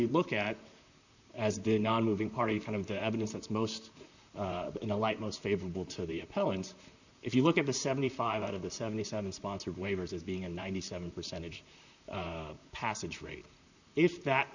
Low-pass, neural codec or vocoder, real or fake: 7.2 kHz; vocoder, 44.1 kHz, 128 mel bands, Pupu-Vocoder; fake